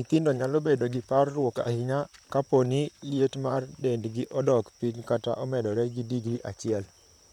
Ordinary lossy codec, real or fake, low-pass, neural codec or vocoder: none; fake; 19.8 kHz; vocoder, 44.1 kHz, 128 mel bands, Pupu-Vocoder